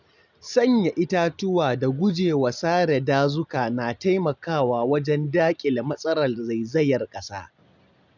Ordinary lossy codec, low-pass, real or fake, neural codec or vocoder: none; 7.2 kHz; real; none